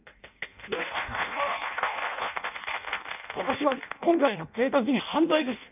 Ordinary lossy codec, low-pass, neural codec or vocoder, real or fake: none; 3.6 kHz; codec, 16 kHz in and 24 kHz out, 0.6 kbps, FireRedTTS-2 codec; fake